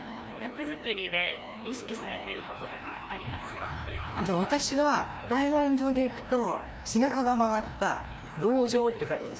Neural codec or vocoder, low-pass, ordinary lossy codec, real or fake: codec, 16 kHz, 1 kbps, FreqCodec, larger model; none; none; fake